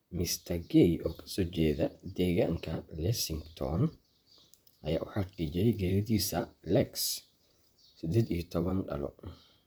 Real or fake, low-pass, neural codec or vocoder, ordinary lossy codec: fake; none; vocoder, 44.1 kHz, 128 mel bands, Pupu-Vocoder; none